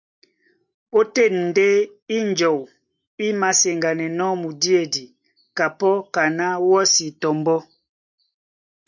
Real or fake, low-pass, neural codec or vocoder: real; 7.2 kHz; none